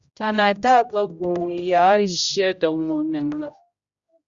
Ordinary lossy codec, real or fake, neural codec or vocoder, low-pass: Opus, 64 kbps; fake; codec, 16 kHz, 0.5 kbps, X-Codec, HuBERT features, trained on general audio; 7.2 kHz